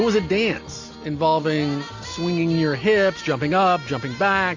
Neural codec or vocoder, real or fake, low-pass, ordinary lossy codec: none; real; 7.2 kHz; AAC, 48 kbps